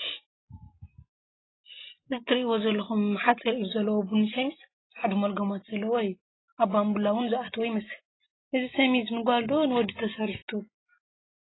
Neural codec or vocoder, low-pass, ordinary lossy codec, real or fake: none; 7.2 kHz; AAC, 16 kbps; real